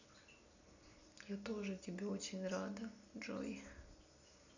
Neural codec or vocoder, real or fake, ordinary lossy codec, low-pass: none; real; none; 7.2 kHz